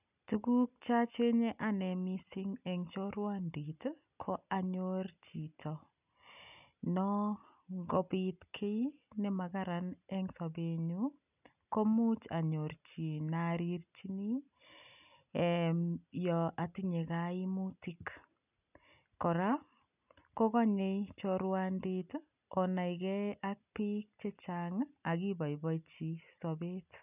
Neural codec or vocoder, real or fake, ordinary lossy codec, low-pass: none; real; none; 3.6 kHz